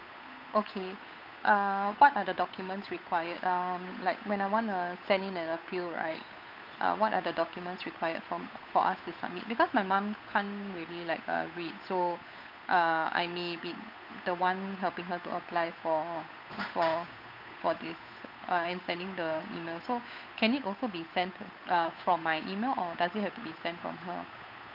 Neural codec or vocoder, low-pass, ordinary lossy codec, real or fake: codec, 16 kHz, 8 kbps, FunCodec, trained on Chinese and English, 25 frames a second; 5.4 kHz; none; fake